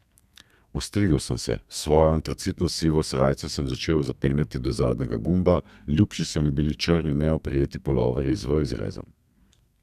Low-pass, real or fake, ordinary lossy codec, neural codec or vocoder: 14.4 kHz; fake; none; codec, 32 kHz, 1.9 kbps, SNAC